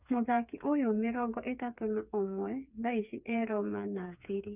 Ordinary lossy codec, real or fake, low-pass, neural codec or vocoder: none; fake; 3.6 kHz; codec, 16 kHz, 4 kbps, FreqCodec, smaller model